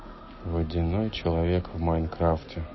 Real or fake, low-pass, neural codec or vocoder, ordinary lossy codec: real; 7.2 kHz; none; MP3, 24 kbps